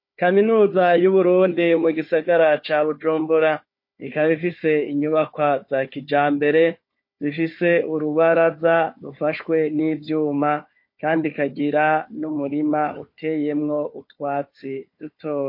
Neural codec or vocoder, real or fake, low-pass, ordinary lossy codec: codec, 16 kHz, 4 kbps, FunCodec, trained on Chinese and English, 50 frames a second; fake; 5.4 kHz; MP3, 32 kbps